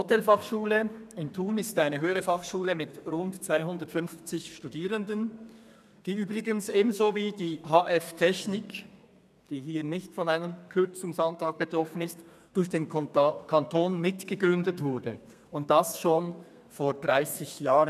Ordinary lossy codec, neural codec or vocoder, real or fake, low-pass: none; codec, 32 kHz, 1.9 kbps, SNAC; fake; 14.4 kHz